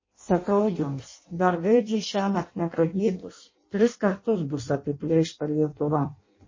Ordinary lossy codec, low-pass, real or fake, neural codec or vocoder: MP3, 32 kbps; 7.2 kHz; fake; codec, 16 kHz in and 24 kHz out, 0.6 kbps, FireRedTTS-2 codec